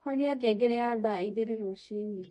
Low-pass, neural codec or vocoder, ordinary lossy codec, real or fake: 10.8 kHz; codec, 24 kHz, 0.9 kbps, WavTokenizer, medium music audio release; MP3, 48 kbps; fake